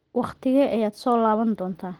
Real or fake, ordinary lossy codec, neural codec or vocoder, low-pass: real; Opus, 16 kbps; none; 19.8 kHz